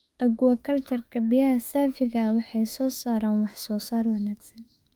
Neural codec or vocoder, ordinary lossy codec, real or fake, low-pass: autoencoder, 48 kHz, 32 numbers a frame, DAC-VAE, trained on Japanese speech; Opus, 32 kbps; fake; 19.8 kHz